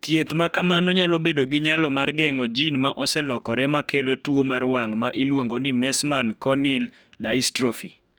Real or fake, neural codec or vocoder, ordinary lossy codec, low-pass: fake; codec, 44.1 kHz, 2.6 kbps, DAC; none; none